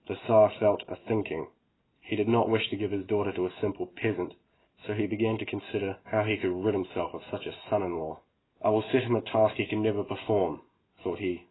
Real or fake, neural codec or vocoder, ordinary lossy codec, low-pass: real; none; AAC, 16 kbps; 7.2 kHz